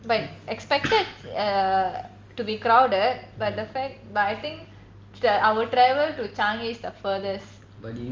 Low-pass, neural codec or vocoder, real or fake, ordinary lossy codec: 7.2 kHz; none; real; Opus, 32 kbps